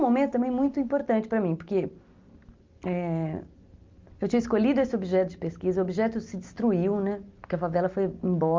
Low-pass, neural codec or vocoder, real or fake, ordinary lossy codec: 7.2 kHz; none; real; Opus, 24 kbps